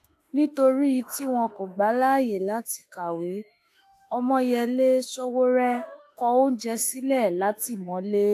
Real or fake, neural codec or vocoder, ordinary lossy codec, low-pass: fake; autoencoder, 48 kHz, 32 numbers a frame, DAC-VAE, trained on Japanese speech; AAC, 64 kbps; 14.4 kHz